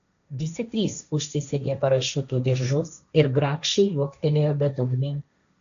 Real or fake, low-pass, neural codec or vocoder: fake; 7.2 kHz; codec, 16 kHz, 1.1 kbps, Voila-Tokenizer